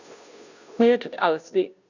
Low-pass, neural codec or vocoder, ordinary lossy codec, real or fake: 7.2 kHz; codec, 16 kHz, 0.5 kbps, FunCodec, trained on Chinese and English, 25 frames a second; none; fake